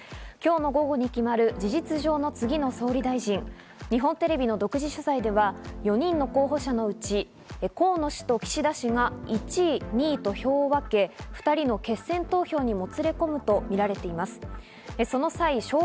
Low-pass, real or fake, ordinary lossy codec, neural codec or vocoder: none; real; none; none